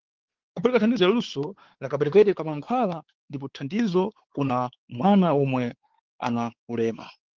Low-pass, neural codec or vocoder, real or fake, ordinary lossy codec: 7.2 kHz; codec, 16 kHz, 4 kbps, X-Codec, WavLM features, trained on Multilingual LibriSpeech; fake; Opus, 16 kbps